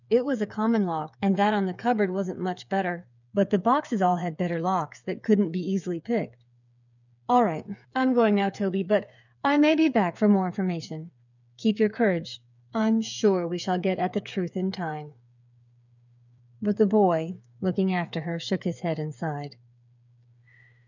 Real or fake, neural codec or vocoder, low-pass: fake; codec, 16 kHz, 8 kbps, FreqCodec, smaller model; 7.2 kHz